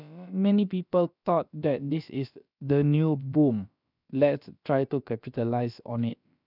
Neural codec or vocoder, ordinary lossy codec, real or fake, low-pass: codec, 16 kHz, about 1 kbps, DyCAST, with the encoder's durations; none; fake; 5.4 kHz